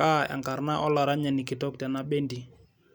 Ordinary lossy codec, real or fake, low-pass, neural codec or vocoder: none; real; none; none